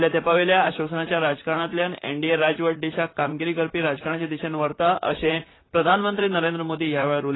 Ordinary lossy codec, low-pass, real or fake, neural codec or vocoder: AAC, 16 kbps; 7.2 kHz; fake; vocoder, 44.1 kHz, 128 mel bands, Pupu-Vocoder